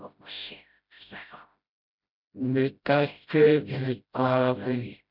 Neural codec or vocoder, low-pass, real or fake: codec, 16 kHz, 0.5 kbps, FreqCodec, smaller model; 5.4 kHz; fake